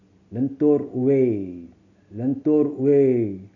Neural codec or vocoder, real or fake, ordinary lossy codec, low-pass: none; real; none; 7.2 kHz